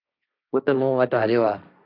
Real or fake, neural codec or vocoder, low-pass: fake; codec, 16 kHz, 1.1 kbps, Voila-Tokenizer; 5.4 kHz